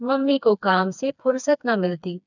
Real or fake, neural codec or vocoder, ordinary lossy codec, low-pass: fake; codec, 16 kHz, 2 kbps, FreqCodec, smaller model; none; 7.2 kHz